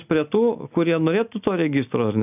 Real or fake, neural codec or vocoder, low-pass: real; none; 3.6 kHz